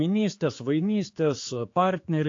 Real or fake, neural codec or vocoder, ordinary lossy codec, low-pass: fake; codec, 16 kHz, 4 kbps, X-Codec, HuBERT features, trained on general audio; AAC, 48 kbps; 7.2 kHz